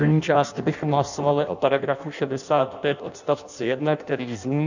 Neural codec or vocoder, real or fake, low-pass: codec, 16 kHz in and 24 kHz out, 0.6 kbps, FireRedTTS-2 codec; fake; 7.2 kHz